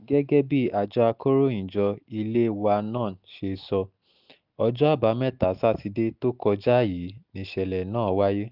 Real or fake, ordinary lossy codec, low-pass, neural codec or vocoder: real; none; 5.4 kHz; none